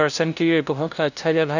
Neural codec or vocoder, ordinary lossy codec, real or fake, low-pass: codec, 16 kHz, 0.5 kbps, FunCodec, trained on LibriTTS, 25 frames a second; none; fake; 7.2 kHz